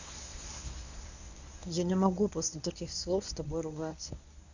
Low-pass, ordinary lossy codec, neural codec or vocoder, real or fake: 7.2 kHz; none; codec, 24 kHz, 0.9 kbps, WavTokenizer, medium speech release version 1; fake